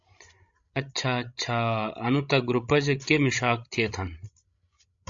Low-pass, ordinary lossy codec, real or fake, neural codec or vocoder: 7.2 kHz; AAC, 64 kbps; fake; codec, 16 kHz, 16 kbps, FreqCodec, larger model